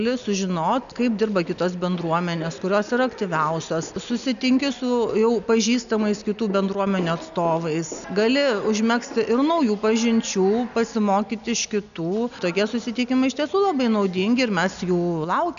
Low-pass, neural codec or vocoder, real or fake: 7.2 kHz; none; real